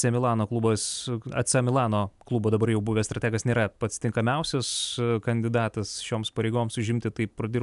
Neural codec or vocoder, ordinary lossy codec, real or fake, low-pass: none; MP3, 96 kbps; real; 10.8 kHz